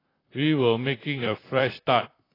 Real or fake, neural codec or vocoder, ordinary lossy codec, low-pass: fake; vocoder, 44.1 kHz, 128 mel bands, Pupu-Vocoder; AAC, 24 kbps; 5.4 kHz